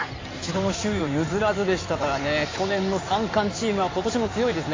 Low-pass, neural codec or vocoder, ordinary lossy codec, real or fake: 7.2 kHz; codec, 16 kHz in and 24 kHz out, 2.2 kbps, FireRedTTS-2 codec; AAC, 32 kbps; fake